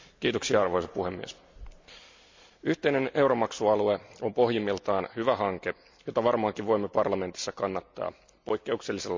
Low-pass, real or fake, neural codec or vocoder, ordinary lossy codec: 7.2 kHz; real; none; MP3, 64 kbps